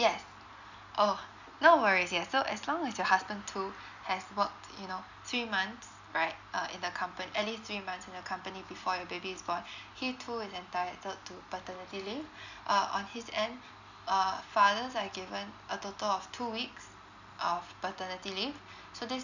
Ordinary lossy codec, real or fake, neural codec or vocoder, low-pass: none; real; none; 7.2 kHz